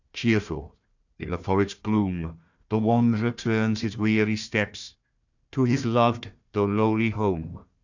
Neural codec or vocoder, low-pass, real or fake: codec, 16 kHz, 1 kbps, FunCodec, trained on Chinese and English, 50 frames a second; 7.2 kHz; fake